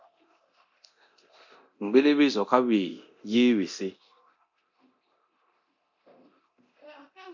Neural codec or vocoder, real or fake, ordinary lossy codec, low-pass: codec, 24 kHz, 0.9 kbps, DualCodec; fake; MP3, 64 kbps; 7.2 kHz